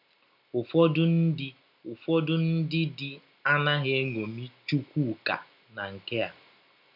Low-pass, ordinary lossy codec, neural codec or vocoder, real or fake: 5.4 kHz; none; none; real